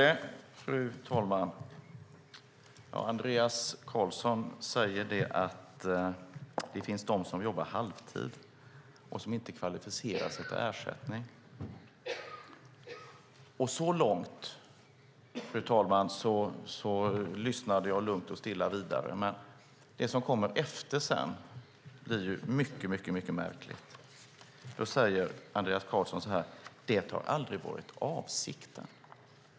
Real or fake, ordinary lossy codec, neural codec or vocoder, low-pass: real; none; none; none